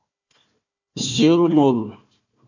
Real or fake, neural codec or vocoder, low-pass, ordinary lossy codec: fake; codec, 16 kHz, 1 kbps, FunCodec, trained on Chinese and English, 50 frames a second; 7.2 kHz; AAC, 48 kbps